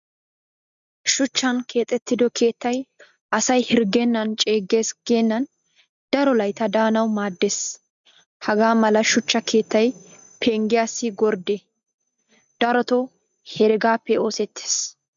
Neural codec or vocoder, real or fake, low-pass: none; real; 7.2 kHz